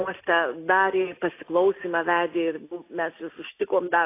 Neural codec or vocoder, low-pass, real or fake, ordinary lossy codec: none; 3.6 kHz; real; AAC, 24 kbps